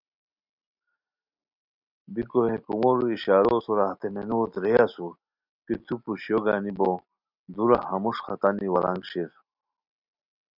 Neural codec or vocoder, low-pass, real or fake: none; 5.4 kHz; real